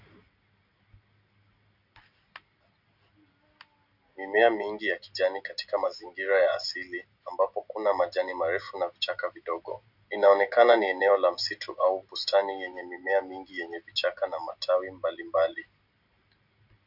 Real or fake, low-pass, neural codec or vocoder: real; 5.4 kHz; none